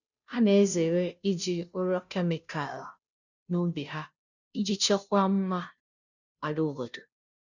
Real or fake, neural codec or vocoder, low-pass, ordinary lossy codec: fake; codec, 16 kHz, 0.5 kbps, FunCodec, trained on Chinese and English, 25 frames a second; 7.2 kHz; none